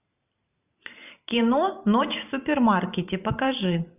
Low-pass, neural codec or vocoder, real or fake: 3.6 kHz; vocoder, 44.1 kHz, 128 mel bands every 512 samples, BigVGAN v2; fake